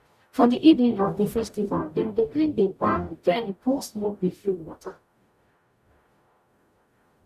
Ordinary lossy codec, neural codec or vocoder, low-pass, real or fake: none; codec, 44.1 kHz, 0.9 kbps, DAC; 14.4 kHz; fake